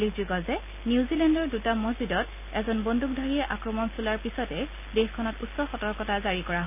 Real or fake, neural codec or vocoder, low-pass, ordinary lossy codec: real; none; 3.6 kHz; none